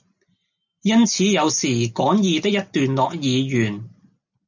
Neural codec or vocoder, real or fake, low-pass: none; real; 7.2 kHz